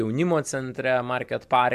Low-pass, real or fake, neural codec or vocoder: 14.4 kHz; real; none